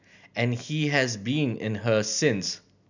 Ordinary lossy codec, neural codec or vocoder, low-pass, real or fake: none; none; 7.2 kHz; real